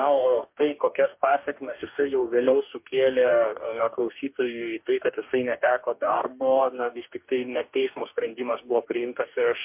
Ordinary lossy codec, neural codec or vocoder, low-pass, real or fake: MP3, 32 kbps; codec, 44.1 kHz, 2.6 kbps, DAC; 3.6 kHz; fake